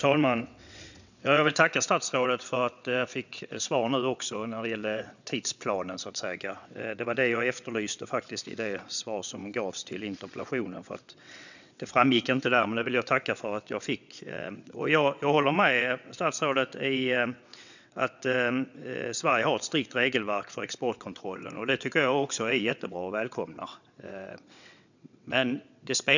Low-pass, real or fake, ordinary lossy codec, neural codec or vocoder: 7.2 kHz; fake; none; vocoder, 22.05 kHz, 80 mel bands, WaveNeXt